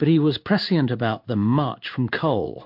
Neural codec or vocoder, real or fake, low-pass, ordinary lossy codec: codec, 16 kHz in and 24 kHz out, 1 kbps, XY-Tokenizer; fake; 5.4 kHz; MP3, 48 kbps